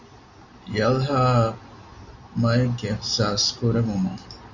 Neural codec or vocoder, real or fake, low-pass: none; real; 7.2 kHz